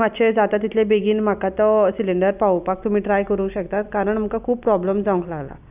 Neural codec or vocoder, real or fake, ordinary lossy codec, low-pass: none; real; none; 3.6 kHz